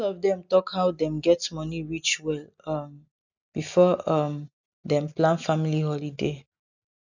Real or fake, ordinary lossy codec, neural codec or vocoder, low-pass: real; none; none; 7.2 kHz